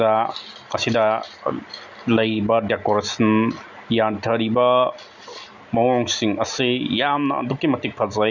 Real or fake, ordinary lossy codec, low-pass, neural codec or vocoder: real; none; 7.2 kHz; none